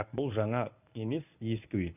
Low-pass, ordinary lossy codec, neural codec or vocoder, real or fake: 3.6 kHz; none; codec, 24 kHz, 0.9 kbps, WavTokenizer, medium speech release version 1; fake